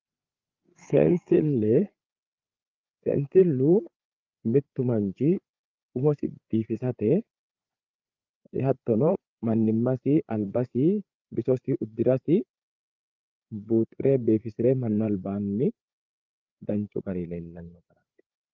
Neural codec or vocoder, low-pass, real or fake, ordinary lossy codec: codec, 16 kHz, 8 kbps, FreqCodec, larger model; 7.2 kHz; fake; Opus, 24 kbps